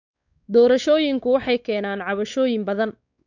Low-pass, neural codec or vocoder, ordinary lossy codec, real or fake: 7.2 kHz; codec, 16 kHz, 4 kbps, X-Codec, WavLM features, trained on Multilingual LibriSpeech; none; fake